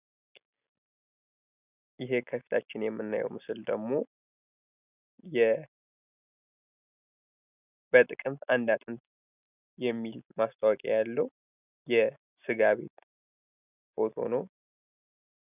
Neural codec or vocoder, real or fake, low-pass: none; real; 3.6 kHz